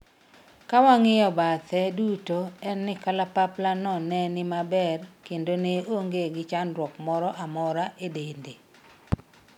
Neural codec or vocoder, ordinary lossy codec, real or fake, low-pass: none; none; real; 19.8 kHz